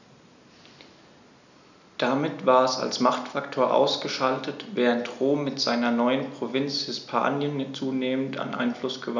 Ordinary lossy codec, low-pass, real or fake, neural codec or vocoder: none; 7.2 kHz; real; none